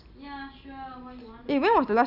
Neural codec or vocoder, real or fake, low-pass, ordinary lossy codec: none; real; 5.4 kHz; none